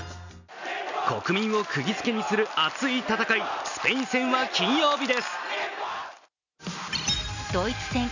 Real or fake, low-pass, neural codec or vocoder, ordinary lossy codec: real; 7.2 kHz; none; none